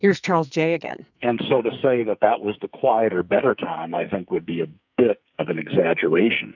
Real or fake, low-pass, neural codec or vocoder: fake; 7.2 kHz; codec, 44.1 kHz, 2.6 kbps, SNAC